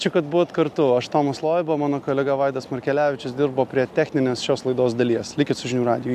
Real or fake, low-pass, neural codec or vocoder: real; 14.4 kHz; none